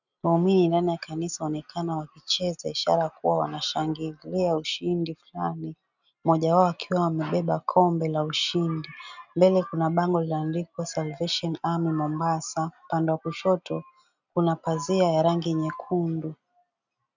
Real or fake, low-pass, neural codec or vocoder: real; 7.2 kHz; none